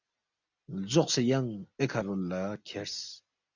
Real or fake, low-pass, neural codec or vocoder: real; 7.2 kHz; none